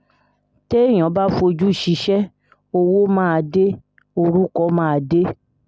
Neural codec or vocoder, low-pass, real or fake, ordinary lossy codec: none; none; real; none